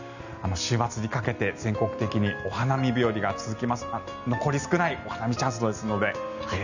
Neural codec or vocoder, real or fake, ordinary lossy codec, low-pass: none; real; none; 7.2 kHz